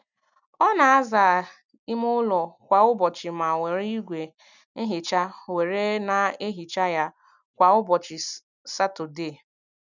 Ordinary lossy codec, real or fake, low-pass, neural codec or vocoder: none; real; 7.2 kHz; none